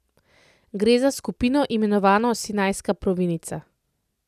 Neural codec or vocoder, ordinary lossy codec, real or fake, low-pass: none; none; real; 14.4 kHz